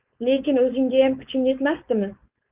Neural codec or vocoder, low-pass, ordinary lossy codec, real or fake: codec, 16 kHz, 4.8 kbps, FACodec; 3.6 kHz; Opus, 32 kbps; fake